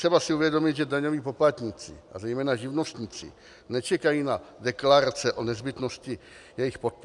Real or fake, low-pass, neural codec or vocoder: real; 10.8 kHz; none